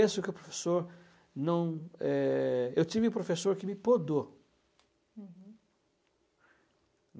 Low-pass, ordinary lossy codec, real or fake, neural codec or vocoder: none; none; real; none